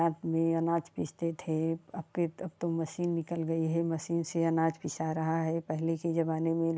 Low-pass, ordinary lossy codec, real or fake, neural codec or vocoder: none; none; real; none